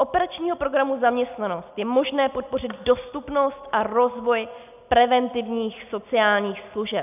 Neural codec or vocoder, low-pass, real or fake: none; 3.6 kHz; real